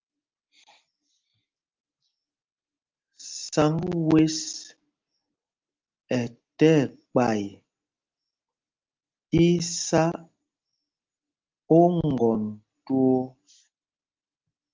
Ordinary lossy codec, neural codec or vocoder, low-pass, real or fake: Opus, 32 kbps; none; 7.2 kHz; real